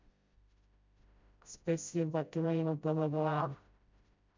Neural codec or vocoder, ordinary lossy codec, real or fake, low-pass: codec, 16 kHz, 0.5 kbps, FreqCodec, smaller model; MP3, 64 kbps; fake; 7.2 kHz